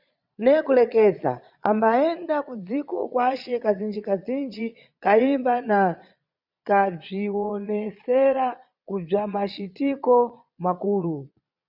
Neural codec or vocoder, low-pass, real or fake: vocoder, 22.05 kHz, 80 mel bands, Vocos; 5.4 kHz; fake